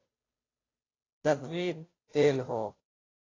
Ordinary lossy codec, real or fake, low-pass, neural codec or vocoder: AAC, 32 kbps; fake; 7.2 kHz; codec, 16 kHz, 0.5 kbps, FunCodec, trained on Chinese and English, 25 frames a second